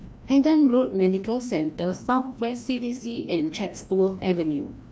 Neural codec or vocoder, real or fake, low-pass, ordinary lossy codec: codec, 16 kHz, 1 kbps, FreqCodec, larger model; fake; none; none